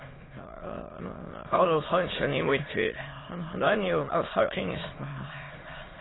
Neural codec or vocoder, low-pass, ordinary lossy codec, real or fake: autoencoder, 22.05 kHz, a latent of 192 numbers a frame, VITS, trained on many speakers; 7.2 kHz; AAC, 16 kbps; fake